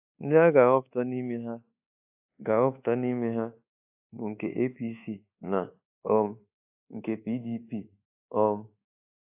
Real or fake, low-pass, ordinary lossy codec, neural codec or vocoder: fake; 3.6 kHz; AAC, 32 kbps; codec, 24 kHz, 1.2 kbps, DualCodec